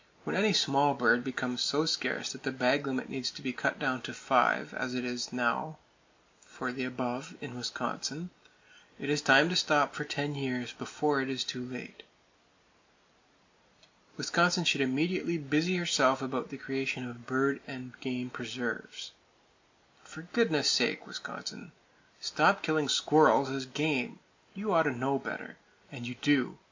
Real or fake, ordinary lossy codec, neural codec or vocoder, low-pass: real; MP3, 48 kbps; none; 7.2 kHz